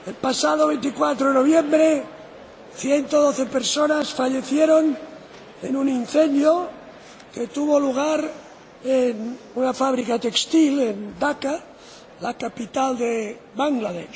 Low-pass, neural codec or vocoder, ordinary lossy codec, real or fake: none; none; none; real